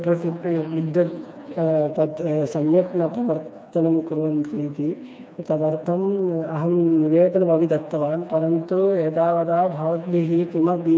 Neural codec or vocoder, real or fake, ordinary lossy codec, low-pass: codec, 16 kHz, 2 kbps, FreqCodec, smaller model; fake; none; none